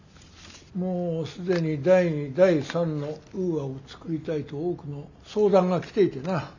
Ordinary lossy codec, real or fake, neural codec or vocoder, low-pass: none; real; none; 7.2 kHz